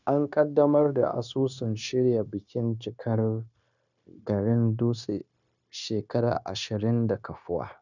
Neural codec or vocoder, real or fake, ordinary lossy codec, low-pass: codec, 16 kHz, 0.9 kbps, LongCat-Audio-Codec; fake; none; 7.2 kHz